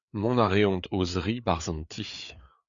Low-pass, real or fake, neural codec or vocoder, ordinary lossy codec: 7.2 kHz; fake; codec, 16 kHz, 4 kbps, FreqCodec, larger model; Opus, 64 kbps